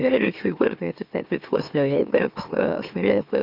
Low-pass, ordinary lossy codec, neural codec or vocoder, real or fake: 5.4 kHz; Opus, 64 kbps; autoencoder, 44.1 kHz, a latent of 192 numbers a frame, MeloTTS; fake